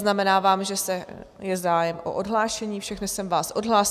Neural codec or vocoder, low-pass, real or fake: none; 14.4 kHz; real